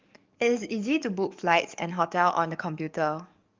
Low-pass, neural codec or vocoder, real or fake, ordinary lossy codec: 7.2 kHz; codec, 44.1 kHz, 7.8 kbps, DAC; fake; Opus, 24 kbps